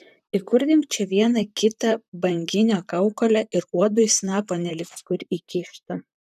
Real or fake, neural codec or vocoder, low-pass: fake; vocoder, 44.1 kHz, 128 mel bands, Pupu-Vocoder; 14.4 kHz